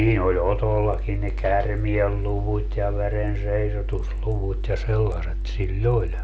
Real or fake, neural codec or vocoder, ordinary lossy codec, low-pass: real; none; none; none